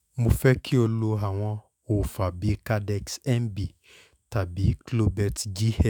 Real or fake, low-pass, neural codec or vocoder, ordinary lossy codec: fake; none; autoencoder, 48 kHz, 128 numbers a frame, DAC-VAE, trained on Japanese speech; none